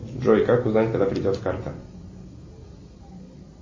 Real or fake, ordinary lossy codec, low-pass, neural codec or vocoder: real; MP3, 32 kbps; 7.2 kHz; none